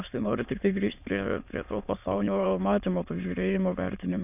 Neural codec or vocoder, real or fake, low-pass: autoencoder, 22.05 kHz, a latent of 192 numbers a frame, VITS, trained on many speakers; fake; 3.6 kHz